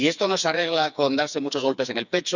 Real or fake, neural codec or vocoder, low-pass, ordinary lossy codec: fake; codec, 16 kHz, 4 kbps, FreqCodec, smaller model; 7.2 kHz; none